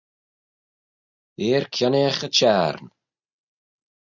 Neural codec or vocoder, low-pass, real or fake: none; 7.2 kHz; real